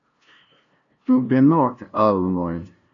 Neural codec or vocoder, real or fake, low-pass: codec, 16 kHz, 0.5 kbps, FunCodec, trained on LibriTTS, 25 frames a second; fake; 7.2 kHz